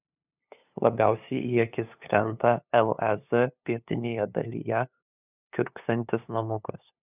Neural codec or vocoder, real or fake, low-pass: codec, 16 kHz, 2 kbps, FunCodec, trained on LibriTTS, 25 frames a second; fake; 3.6 kHz